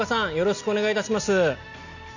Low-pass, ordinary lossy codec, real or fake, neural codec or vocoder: 7.2 kHz; none; real; none